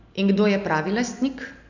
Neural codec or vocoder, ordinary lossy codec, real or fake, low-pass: none; none; real; 7.2 kHz